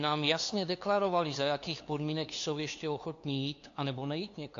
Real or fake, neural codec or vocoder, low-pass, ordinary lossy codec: fake; codec, 16 kHz, 2 kbps, FunCodec, trained on LibriTTS, 25 frames a second; 7.2 kHz; AAC, 48 kbps